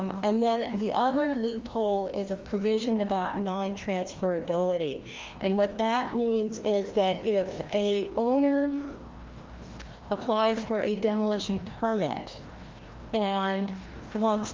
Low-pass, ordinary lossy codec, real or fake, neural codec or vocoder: 7.2 kHz; Opus, 32 kbps; fake; codec, 16 kHz, 1 kbps, FreqCodec, larger model